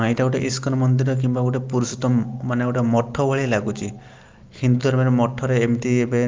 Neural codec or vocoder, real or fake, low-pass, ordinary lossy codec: none; real; 7.2 kHz; Opus, 32 kbps